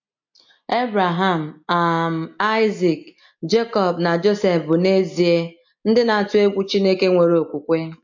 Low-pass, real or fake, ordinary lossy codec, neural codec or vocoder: 7.2 kHz; real; MP3, 48 kbps; none